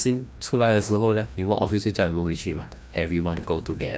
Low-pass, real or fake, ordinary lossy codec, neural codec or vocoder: none; fake; none; codec, 16 kHz, 1 kbps, FreqCodec, larger model